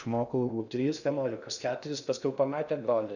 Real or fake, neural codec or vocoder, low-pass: fake; codec, 16 kHz in and 24 kHz out, 0.6 kbps, FocalCodec, streaming, 2048 codes; 7.2 kHz